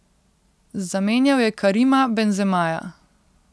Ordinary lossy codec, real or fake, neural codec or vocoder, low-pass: none; real; none; none